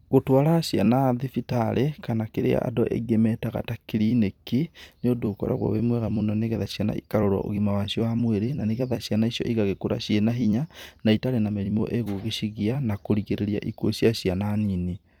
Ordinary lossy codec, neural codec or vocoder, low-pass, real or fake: none; vocoder, 44.1 kHz, 128 mel bands every 256 samples, BigVGAN v2; 19.8 kHz; fake